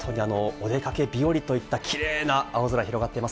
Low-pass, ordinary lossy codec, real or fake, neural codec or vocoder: none; none; real; none